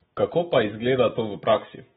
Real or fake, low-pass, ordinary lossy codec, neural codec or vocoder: fake; 19.8 kHz; AAC, 16 kbps; autoencoder, 48 kHz, 128 numbers a frame, DAC-VAE, trained on Japanese speech